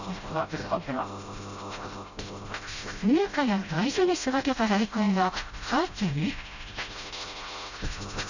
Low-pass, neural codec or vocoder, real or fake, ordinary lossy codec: 7.2 kHz; codec, 16 kHz, 0.5 kbps, FreqCodec, smaller model; fake; none